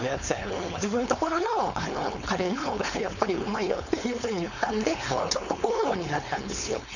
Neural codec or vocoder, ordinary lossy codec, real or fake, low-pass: codec, 16 kHz, 4.8 kbps, FACodec; none; fake; 7.2 kHz